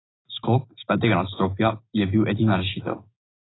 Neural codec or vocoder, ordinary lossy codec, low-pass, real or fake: none; AAC, 16 kbps; 7.2 kHz; real